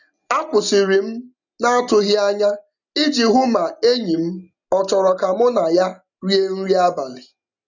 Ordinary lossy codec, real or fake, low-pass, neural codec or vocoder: none; real; 7.2 kHz; none